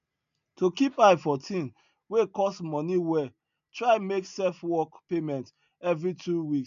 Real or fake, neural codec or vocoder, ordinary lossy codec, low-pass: real; none; none; 7.2 kHz